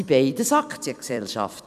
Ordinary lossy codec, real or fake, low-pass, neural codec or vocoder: none; fake; 14.4 kHz; vocoder, 44.1 kHz, 128 mel bands every 256 samples, BigVGAN v2